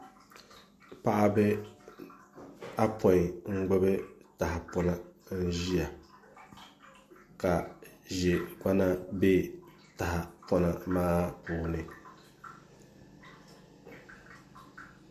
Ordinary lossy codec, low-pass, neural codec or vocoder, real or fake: MP3, 64 kbps; 14.4 kHz; none; real